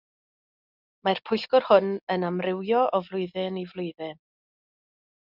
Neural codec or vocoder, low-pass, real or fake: none; 5.4 kHz; real